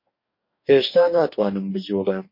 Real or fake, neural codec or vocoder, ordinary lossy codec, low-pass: fake; codec, 44.1 kHz, 2.6 kbps, DAC; MP3, 32 kbps; 5.4 kHz